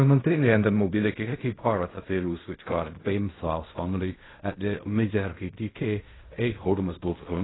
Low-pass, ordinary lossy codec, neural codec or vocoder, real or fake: 7.2 kHz; AAC, 16 kbps; codec, 16 kHz in and 24 kHz out, 0.4 kbps, LongCat-Audio-Codec, fine tuned four codebook decoder; fake